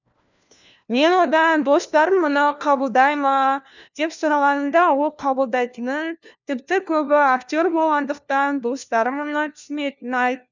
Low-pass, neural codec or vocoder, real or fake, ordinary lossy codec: 7.2 kHz; codec, 16 kHz, 1 kbps, FunCodec, trained on LibriTTS, 50 frames a second; fake; none